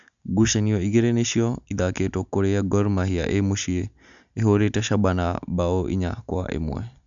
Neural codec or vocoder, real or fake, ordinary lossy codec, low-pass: none; real; none; 7.2 kHz